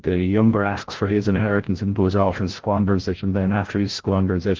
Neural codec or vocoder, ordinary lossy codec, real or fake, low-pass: codec, 16 kHz, 0.5 kbps, FreqCodec, larger model; Opus, 16 kbps; fake; 7.2 kHz